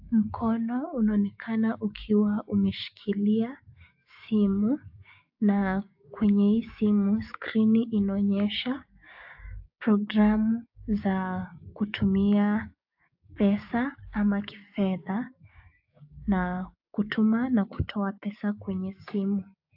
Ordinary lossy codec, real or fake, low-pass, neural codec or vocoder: AAC, 48 kbps; fake; 5.4 kHz; codec, 16 kHz, 6 kbps, DAC